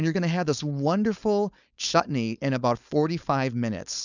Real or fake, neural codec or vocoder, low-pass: fake; codec, 16 kHz, 4.8 kbps, FACodec; 7.2 kHz